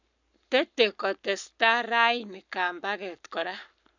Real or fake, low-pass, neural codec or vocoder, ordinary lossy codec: fake; 7.2 kHz; codec, 44.1 kHz, 7.8 kbps, Pupu-Codec; none